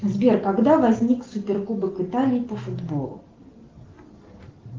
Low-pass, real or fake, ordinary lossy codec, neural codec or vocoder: 7.2 kHz; real; Opus, 16 kbps; none